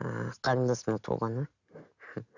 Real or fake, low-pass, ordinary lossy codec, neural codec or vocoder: real; 7.2 kHz; none; none